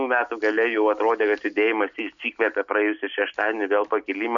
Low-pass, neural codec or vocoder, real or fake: 7.2 kHz; none; real